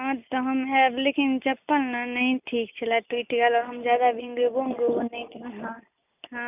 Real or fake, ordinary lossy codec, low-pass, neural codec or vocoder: real; none; 3.6 kHz; none